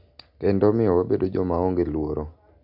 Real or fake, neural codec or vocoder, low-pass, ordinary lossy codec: real; none; 5.4 kHz; none